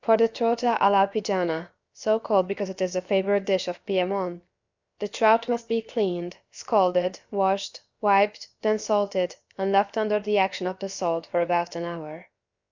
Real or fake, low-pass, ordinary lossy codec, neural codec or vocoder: fake; 7.2 kHz; Opus, 64 kbps; codec, 16 kHz, about 1 kbps, DyCAST, with the encoder's durations